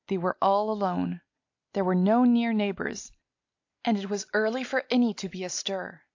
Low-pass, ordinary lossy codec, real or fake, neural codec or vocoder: 7.2 kHz; AAC, 48 kbps; real; none